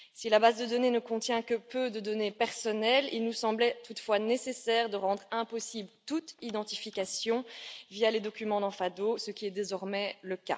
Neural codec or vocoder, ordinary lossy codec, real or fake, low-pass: none; none; real; none